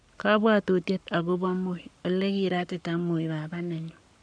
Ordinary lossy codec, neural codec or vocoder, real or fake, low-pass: none; codec, 44.1 kHz, 7.8 kbps, Pupu-Codec; fake; 9.9 kHz